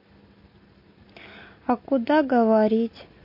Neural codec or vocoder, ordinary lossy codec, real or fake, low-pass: none; MP3, 32 kbps; real; 5.4 kHz